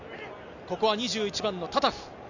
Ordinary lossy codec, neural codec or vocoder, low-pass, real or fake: none; none; 7.2 kHz; real